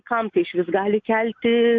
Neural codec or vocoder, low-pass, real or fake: none; 7.2 kHz; real